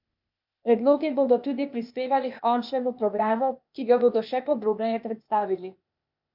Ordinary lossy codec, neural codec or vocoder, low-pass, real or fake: MP3, 48 kbps; codec, 16 kHz, 0.8 kbps, ZipCodec; 5.4 kHz; fake